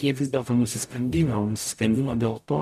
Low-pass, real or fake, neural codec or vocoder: 14.4 kHz; fake; codec, 44.1 kHz, 0.9 kbps, DAC